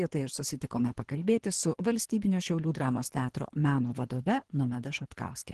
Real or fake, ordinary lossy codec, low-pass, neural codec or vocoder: fake; Opus, 16 kbps; 10.8 kHz; codec, 24 kHz, 3 kbps, HILCodec